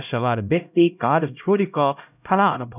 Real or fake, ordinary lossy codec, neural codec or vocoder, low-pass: fake; none; codec, 16 kHz, 0.5 kbps, X-Codec, HuBERT features, trained on LibriSpeech; 3.6 kHz